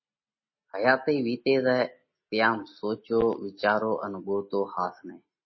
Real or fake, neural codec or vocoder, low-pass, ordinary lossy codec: real; none; 7.2 kHz; MP3, 24 kbps